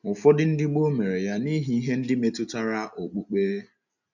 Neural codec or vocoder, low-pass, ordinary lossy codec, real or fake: none; 7.2 kHz; none; real